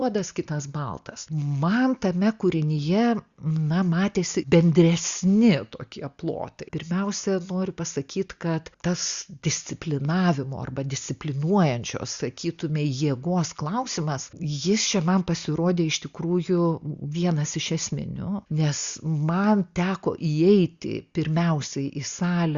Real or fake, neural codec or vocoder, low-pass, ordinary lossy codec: real; none; 7.2 kHz; Opus, 64 kbps